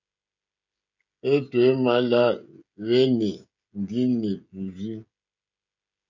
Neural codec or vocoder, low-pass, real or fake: codec, 16 kHz, 16 kbps, FreqCodec, smaller model; 7.2 kHz; fake